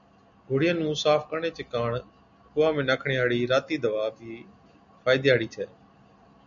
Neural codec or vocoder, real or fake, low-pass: none; real; 7.2 kHz